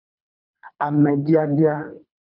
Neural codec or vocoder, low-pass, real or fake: codec, 24 kHz, 3 kbps, HILCodec; 5.4 kHz; fake